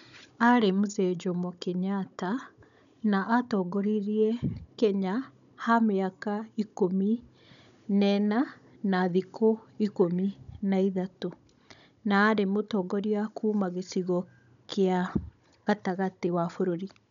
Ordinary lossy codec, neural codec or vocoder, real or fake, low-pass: none; codec, 16 kHz, 16 kbps, FunCodec, trained on Chinese and English, 50 frames a second; fake; 7.2 kHz